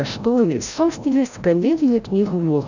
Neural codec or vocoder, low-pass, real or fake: codec, 16 kHz, 0.5 kbps, FreqCodec, larger model; 7.2 kHz; fake